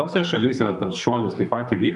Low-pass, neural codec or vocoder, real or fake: 10.8 kHz; codec, 32 kHz, 1.9 kbps, SNAC; fake